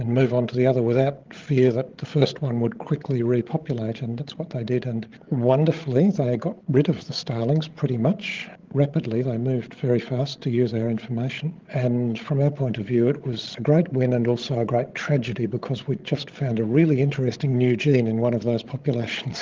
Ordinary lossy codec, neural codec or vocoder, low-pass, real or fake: Opus, 16 kbps; codec, 16 kHz, 16 kbps, FreqCodec, larger model; 7.2 kHz; fake